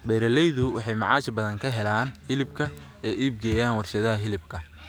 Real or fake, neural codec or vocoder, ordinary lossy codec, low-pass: fake; codec, 44.1 kHz, 7.8 kbps, DAC; none; none